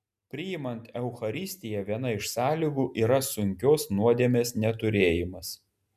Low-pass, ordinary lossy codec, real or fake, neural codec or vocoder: 14.4 kHz; MP3, 96 kbps; real; none